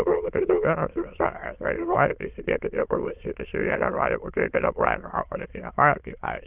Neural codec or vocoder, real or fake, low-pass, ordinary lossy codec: autoencoder, 22.05 kHz, a latent of 192 numbers a frame, VITS, trained on many speakers; fake; 3.6 kHz; Opus, 32 kbps